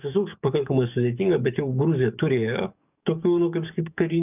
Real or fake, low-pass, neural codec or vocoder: fake; 3.6 kHz; codec, 44.1 kHz, 7.8 kbps, DAC